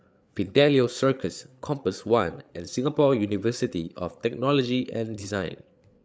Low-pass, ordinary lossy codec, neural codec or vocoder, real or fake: none; none; codec, 16 kHz, 8 kbps, FreqCodec, larger model; fake